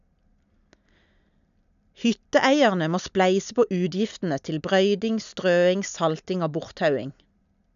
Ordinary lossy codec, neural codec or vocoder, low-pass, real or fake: none; none; 7.2 kHz; real